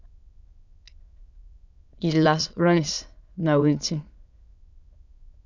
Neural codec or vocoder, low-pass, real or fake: autoencoder, 22.05 kHz, a latent of 192 numbers a frame, VITS, trained on many speakers; 7.2 kHz; fake